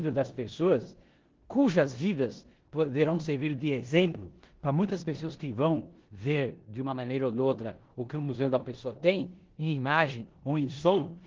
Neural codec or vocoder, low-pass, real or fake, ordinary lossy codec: codec, 16 kHz in and 24 kHz out, 0.9 kbps, LongCat-Audio-Codec, four codebook decoder; 7.2 kHz; fake; Opus, 16 kbps